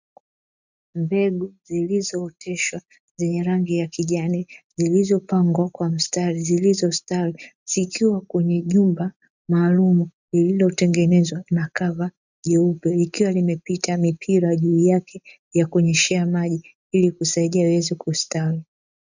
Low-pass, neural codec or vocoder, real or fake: 7.2 kHz; none; real